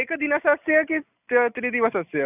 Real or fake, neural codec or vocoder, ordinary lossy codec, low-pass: real; none; none; 3.6 kHz